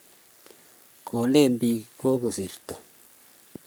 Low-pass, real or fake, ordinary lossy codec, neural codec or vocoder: none; fake; none; codec, 44.1 kHz, 3.4 kbps, Pupu-Codec